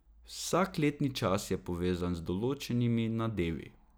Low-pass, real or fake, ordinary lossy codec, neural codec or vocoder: none; real; none; none